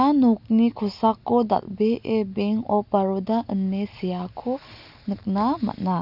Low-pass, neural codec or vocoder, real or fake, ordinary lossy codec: 5.4 kHz; none; real; none